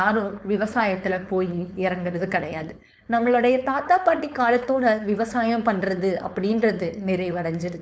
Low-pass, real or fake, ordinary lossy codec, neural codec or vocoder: none; fake; none; codec, 16 kHz, 4.8 kbps, FACodec